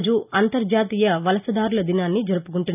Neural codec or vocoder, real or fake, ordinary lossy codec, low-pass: none; real; none; 3.6 kHz